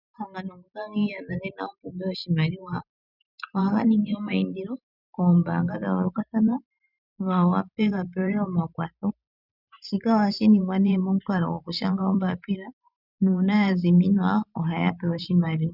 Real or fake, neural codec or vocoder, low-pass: fake; vocoder, 44.1 kHz, 128 mel bands every 512 samples, BigVGAN v2; 5.4 kHz